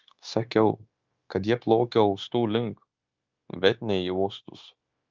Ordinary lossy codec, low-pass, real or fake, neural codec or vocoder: Opus, 24 kbps; 7.2 kHz; fake; codec, 16 kHz, 0.9 kbps, LongCat-Audio-Codec